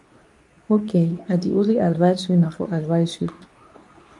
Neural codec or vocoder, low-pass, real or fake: codec, 24 kHz, 0.9 kbps, WavTokenizer, medium speech release version 2; 10.8 kHz; fake